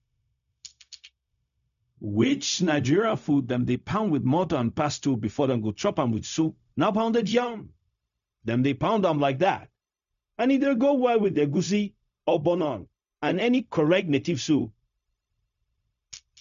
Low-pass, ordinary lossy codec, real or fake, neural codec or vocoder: 7.2 kHz; none; fake; codec, 16 kHz, 0.4 kbps, LongCat-Audio-Codec